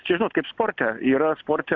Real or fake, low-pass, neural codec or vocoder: real; 7.2 kHz; none